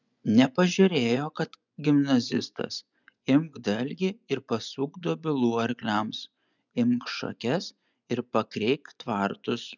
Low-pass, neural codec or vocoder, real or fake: 7.2 kHz; vocoder, 24 kHz, 100 mel bands, Vocos; fake